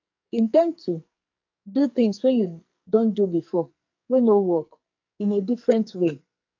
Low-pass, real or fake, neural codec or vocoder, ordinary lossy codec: 7.2 kHz; fake; codec, 44.1 kHz, 2.6 kbps, SNAC; AAC, 48 kbps